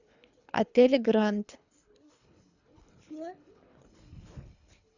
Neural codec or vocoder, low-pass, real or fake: codec, 24 kHz, 3 kbps, HILCodec; 7.2 kHz; fake